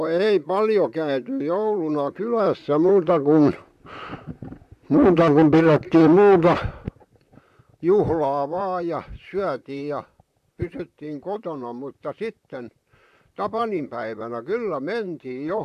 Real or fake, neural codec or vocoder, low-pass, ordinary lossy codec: fake; vocoder, 44.1 kHz, 128 mel bands, Pupu-Vocoder; 14.4 kHz; AAC, 96 kbps